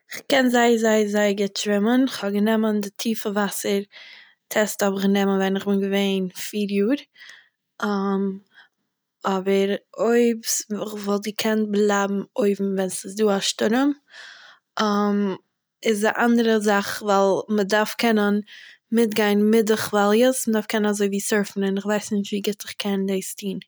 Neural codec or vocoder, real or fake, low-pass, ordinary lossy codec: none; real; none; none